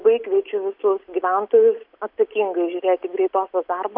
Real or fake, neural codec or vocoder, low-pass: real; none; 5.4 kHz